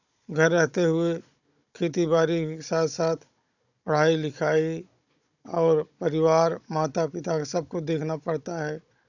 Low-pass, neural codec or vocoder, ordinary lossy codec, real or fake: 7.2 kHz; codec, 16 kHz, 16 kbps, FunCodec, trained on Chinese and English, 50 frames a second; none; fake